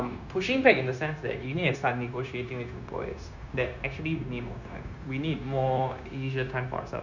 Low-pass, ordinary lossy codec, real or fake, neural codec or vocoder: 7.2 kHz; none; fake; codec, 16 kHz, 0.9 kbps, LongCat-Audio-Codec